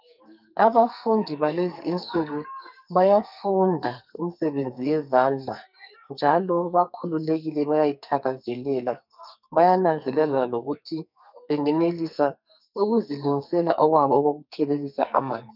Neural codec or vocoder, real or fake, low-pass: codec, 44.1 kHz, 2.6 kbps, SNAC; fake; 5.4 kHz